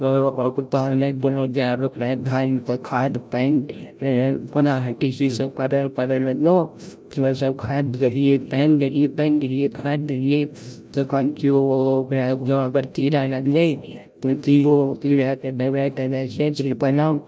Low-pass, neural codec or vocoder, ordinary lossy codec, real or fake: none; codec, 16 kHz, 0.5 kbps, FreqCodec, larger model; none; fake